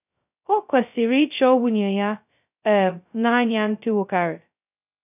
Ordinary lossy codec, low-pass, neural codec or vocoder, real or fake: none; 3.6 kHz; codec, 16 kHz, 0.2 kbps, FocalCodec; fake